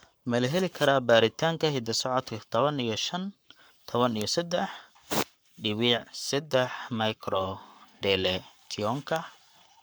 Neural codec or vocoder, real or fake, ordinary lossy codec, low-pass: codec, 44.1 kHz, 7.8 kbps, Pupu-Codec; fake; none; none